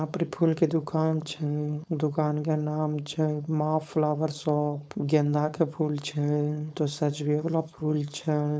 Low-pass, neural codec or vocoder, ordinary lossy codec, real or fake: none; codec, 16 kHz, 4.8 kbps, FACodec; none; fake